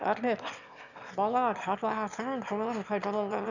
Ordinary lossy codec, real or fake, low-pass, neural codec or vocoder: none; fake; 7.2 kHz; autoencoder, 22.05 kHz, a latent of 192 numbers a frame, VITS, trained on one speaker